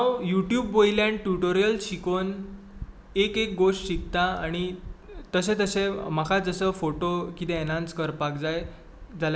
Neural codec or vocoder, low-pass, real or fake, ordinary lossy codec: none; none; real; none